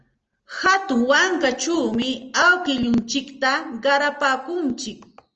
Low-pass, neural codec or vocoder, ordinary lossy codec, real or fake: 7.2 kHz; none; Opus, 24 kbps; real